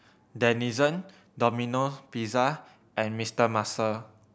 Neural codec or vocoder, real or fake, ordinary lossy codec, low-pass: none; real; none; none